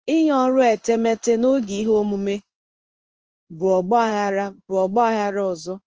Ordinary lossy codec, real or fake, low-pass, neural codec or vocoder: Opus, 24 kbps; fake; 7.2 kHz; codec, 16 kHz in and 24 kHz out, 1 kbps, XY-Tokenizer